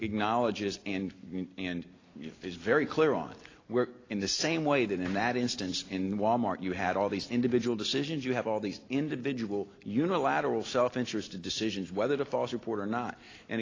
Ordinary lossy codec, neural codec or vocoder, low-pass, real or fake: AAC, 32 kbps; none; 7.2 kHz; real